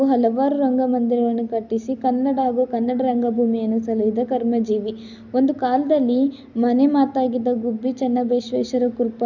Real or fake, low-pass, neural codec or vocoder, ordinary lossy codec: real; 7.2 kHz; none; none